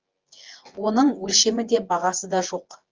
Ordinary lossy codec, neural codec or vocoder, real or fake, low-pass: Opus, 24 kbps; vocoder, 24 kHz, 100 mel bands, Vocos; fake; 7.2 kHz